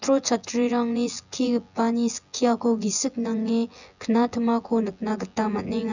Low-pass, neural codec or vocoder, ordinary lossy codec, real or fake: 7.2 kHz; vocoder, 24 kHz, 100 mel bands, Vocos; none; fake